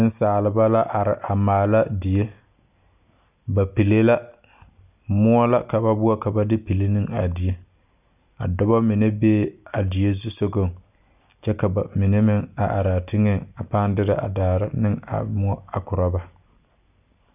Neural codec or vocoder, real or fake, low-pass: none; real; 3.6 kHz